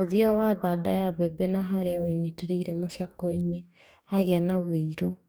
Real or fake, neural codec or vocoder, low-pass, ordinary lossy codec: fake; codec, 44.1 kHz, 2.6 kbps, DAC; none; none